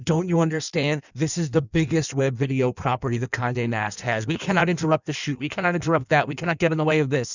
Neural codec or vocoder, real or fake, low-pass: codec, 16 kHz in and 24 kHz out, 1.1 kbps, FireRedTTS-2 codec; fake; 7.2 kHz